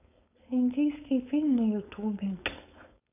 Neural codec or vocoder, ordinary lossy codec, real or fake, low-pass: codec, 16 kHz, 4.8 kbps, FACodec; none; fake; 3.6 kHz